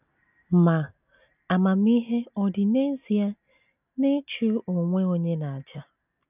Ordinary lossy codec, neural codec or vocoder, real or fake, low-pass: none; none; real; 3.6 kHz